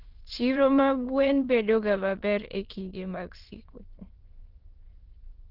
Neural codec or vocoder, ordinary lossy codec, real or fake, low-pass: autoencoder, 22.05 kHz, a latent of 192 numbers a frame, VITS, trained on many speakers; Opus, 16 kbps; fake; 5.4 kHz